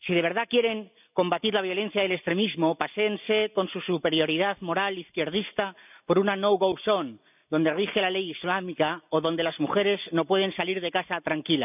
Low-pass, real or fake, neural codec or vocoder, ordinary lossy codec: 3.6 kHz; real; none; none